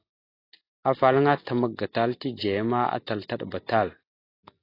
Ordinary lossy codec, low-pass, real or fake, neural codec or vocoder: AAC, 32 kbps; 5.4 kHz; real; none